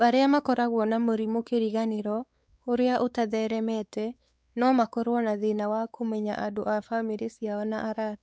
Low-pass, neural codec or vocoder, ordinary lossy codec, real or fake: none; codec, 16 kHz, 4 kbps, X-Codec, WavLM features, trained on Multilingual LibriSpeech; none; fake